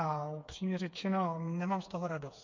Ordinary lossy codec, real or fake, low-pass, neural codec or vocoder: MP3, 48 kbps; fake; 7.2 kHz; codec, 16 kHz, 4 kbps, FreqCodec, smaller model